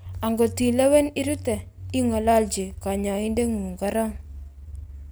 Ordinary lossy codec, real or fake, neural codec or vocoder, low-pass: none; real; none; none